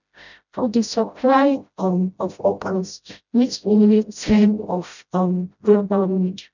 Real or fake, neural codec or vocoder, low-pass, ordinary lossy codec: fake; codec, 16 kHz, 0.5 kbps, FreqCodec, smaller model; 7.2 kHz; none